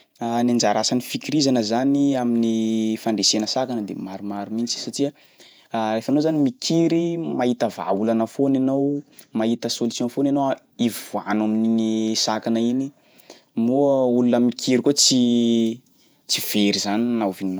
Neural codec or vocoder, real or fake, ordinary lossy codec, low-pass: vocoder, 48 kHz, 128 mel bands, Vocos; fake; none; none